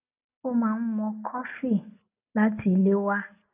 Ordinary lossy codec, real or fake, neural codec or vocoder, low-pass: none; real; none; 3.6 kHz